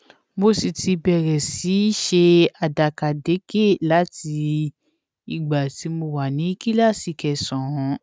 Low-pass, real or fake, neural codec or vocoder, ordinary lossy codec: none; real; none; none